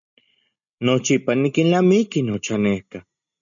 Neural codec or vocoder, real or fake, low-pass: none; real; 7.2 kHz